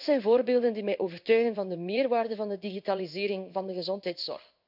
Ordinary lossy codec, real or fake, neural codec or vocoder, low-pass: none; fake; codec, 16 kHz in and 24 kHz out, 1 kbps, XY-Tokenizer; 5.4 kHz